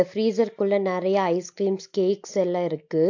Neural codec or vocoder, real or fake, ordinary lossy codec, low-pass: none; real; AAC, 48 kbps; 7.2 kHz